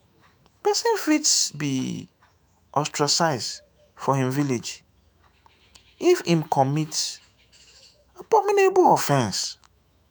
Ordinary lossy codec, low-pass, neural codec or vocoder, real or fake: none; none; autoencoder, 48 kHz, 128 numbers a frame, DAC-VAE, trained on Japanese speech; fake